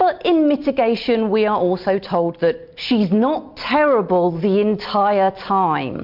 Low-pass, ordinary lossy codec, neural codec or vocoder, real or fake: 5.4 kHz; MP3, 48 kbps; none; real